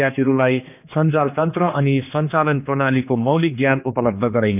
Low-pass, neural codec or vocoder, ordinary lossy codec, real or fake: 3.6 kHz; codec, 16 kHz, 2 kbps, X-Codec, HuBERT features, trained on general audio; none; fake